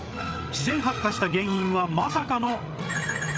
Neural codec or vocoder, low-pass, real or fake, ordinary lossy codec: codec, 16 kHz, 8 kbps, FreqCodec, larger model; none; fake; none